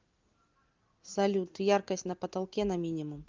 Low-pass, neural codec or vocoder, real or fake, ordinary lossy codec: 7.2 kHz; none; real; Opus, 32 kbps